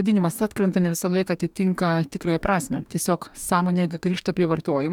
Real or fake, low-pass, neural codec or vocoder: fake; 19.8 kHz; codec, 44.1 kHz, 2.6 kbps, DAC